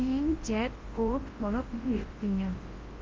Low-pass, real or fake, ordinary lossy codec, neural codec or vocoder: 7.2 kHz; fake; Opus, 16 kbps; codec, 24 kHz, 0.9 kbps, WavTokenizer, large speech release